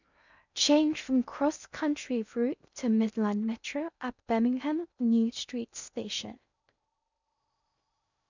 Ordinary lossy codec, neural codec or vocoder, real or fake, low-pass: none; codec, 16 kHz in and 24 kHz out, 0.6 kbps, FocalCodec, streaming, 2048 codes; fake; 7.2 kHz